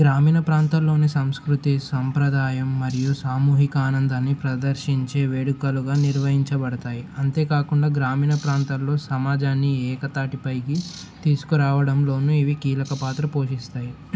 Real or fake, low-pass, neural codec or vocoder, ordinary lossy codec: real; none; none; none